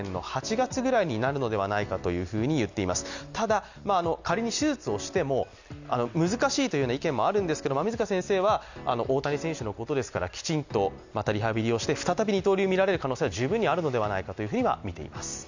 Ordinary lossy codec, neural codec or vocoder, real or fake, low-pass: none; none; real; 7.2 kHz